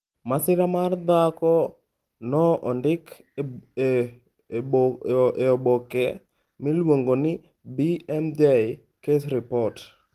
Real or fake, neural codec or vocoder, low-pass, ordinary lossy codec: real; none; 14.4 kHz; Opus, 24 kbps